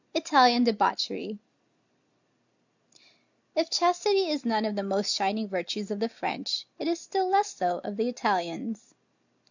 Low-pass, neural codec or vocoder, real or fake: 7.2 kHz; none; real